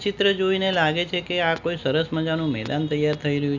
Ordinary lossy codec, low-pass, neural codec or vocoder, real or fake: none; 7.2 kHz; none; real